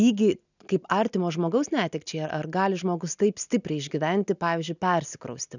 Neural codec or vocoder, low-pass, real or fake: none; 7.2 kHz; real